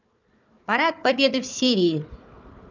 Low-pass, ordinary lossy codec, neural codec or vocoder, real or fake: 7.2 kHz; none; codec, 16 kHz, 4 kbps, FunCodec, trained on Chinese and English, 50 frames a second; fake